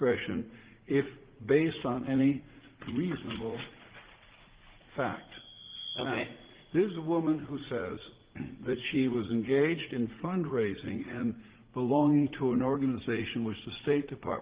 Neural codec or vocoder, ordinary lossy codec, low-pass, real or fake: vocoder, 44.1 kHz, 80 mel bands, Vocos; Opus, 16 kbps; 3.6 kHz; fake